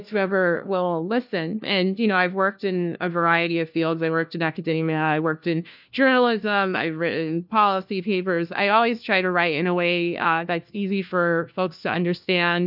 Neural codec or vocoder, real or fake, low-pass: codec, 16 kHz, 1 kbps, FunCodec, trained on LibriTTS, 50 frames a second; fake; 5.4 kHz